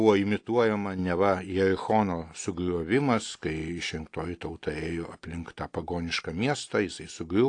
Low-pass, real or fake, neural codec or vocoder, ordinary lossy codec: 9.9 kHz; real; none; AAC, 48 kbps